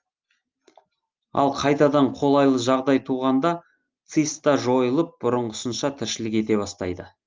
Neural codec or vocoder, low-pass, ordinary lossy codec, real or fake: none; 7.2 kHz; Opus, 32 kbps; real